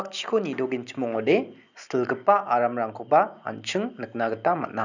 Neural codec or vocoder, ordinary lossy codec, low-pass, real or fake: none; none; 7.2 kHz; real